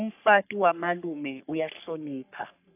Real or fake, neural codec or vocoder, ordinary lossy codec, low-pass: fake; codec, 44.1 kHz, 3.4 kbps, Pupu-Codec; AAC, 32 kbps; 3.6 kHz